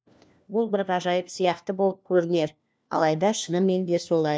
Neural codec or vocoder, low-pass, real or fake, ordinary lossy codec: codec, 16 kHz, 1 kbps, FunCodec, trained on LibriTTS, 50 frames a second; none; fake; none